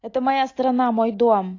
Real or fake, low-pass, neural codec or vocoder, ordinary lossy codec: real; 7.2 kHz; none; AAC, 32 kbps